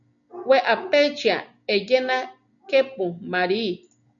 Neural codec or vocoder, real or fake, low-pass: none; real; 7.2 kHz